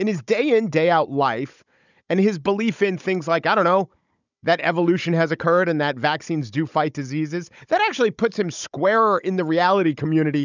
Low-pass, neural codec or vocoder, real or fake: 7.2 kHz; codec, 16 kHz, 16 kbps, FunCodec, trained on Chinese and English, 50 frames a second; fake